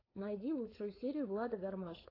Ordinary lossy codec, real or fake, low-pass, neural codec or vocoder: AAC, 24 kbps; fake; 5.4 kHz; codec, 16 kHz, 4.8 kbps, FACodec